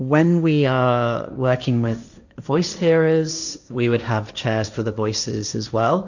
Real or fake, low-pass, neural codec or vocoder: fake; 7.2 kHz; codec, 16 kHz, 1.1 kbps, Voila-Tokenizer